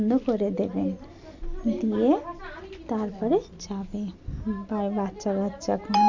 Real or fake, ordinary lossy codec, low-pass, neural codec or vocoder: real; MP3, 64 kbps; 7.2 kHz; none